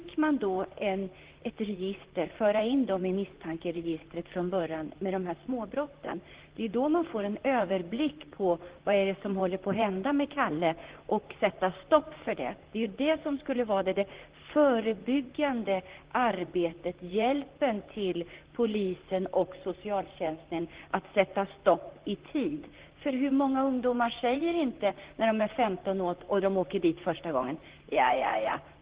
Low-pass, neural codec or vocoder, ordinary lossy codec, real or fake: 3.6 kHz; vocoder, 44.1 kHz, 128 mel bands, Pupu-Vocoder; Opus, 16 kbps; fake